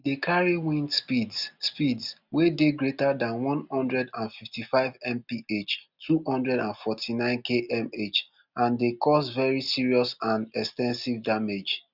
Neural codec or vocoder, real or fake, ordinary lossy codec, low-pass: none; real; none; 5.4 kHz